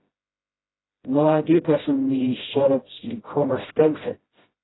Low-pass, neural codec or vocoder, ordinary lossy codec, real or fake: 7.2 kHz; codec, 16 kHz, 0.5 kbps, FreqCodec, smaller model; AAC, 16 kbps; fake